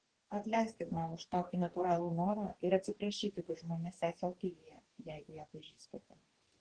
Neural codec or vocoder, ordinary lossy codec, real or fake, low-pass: codec, 44.1 kHz, 2.6 kbps, DAC; Opus, 16 kbps; fake; 9.9 kHz